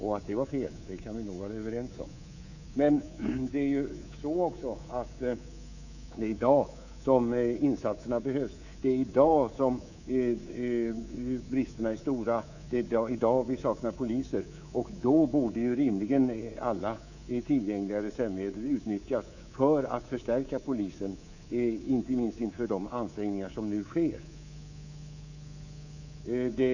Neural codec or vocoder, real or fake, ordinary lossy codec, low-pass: codec, 24 kHz, 3.1 kbps, DualCodec; fake; none; 7.2 kHz